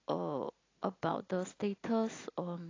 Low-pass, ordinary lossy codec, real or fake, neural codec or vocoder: 7.2 kHz; AAC, 32 kbps; real; none